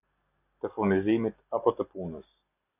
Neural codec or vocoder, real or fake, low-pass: none; real; 3.6 kHz